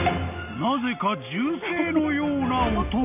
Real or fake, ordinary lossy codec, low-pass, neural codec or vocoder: real; none; 3.6 kHz; none